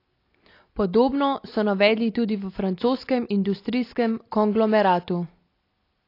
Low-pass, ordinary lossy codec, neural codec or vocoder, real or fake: 5.4 kHz; AAC, 32 kbps; none; real